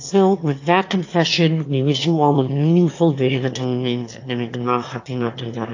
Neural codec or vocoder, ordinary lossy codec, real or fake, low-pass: autoencoder, 22.05 kHz, a latent of 192 numbers a frame, VITS, trained on one speaker; AAC, 48 kbps; fake; 7.2 kHz